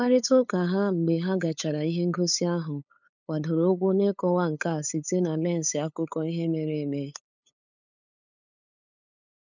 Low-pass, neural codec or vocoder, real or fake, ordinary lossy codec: 7.2 kHz; codec, 16 kHz, 8 kbps, FunCodec, trained on LibriTTS, 25 frames a second; fake; none